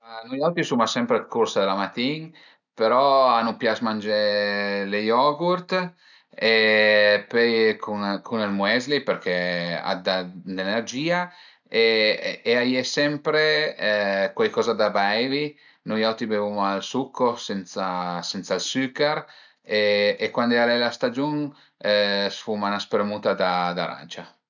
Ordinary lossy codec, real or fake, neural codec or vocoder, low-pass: none; real; none; 7.2 kHz